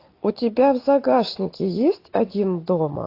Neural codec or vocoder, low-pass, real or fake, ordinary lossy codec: none; 5.4 kHz; real; AAC, 32 kbps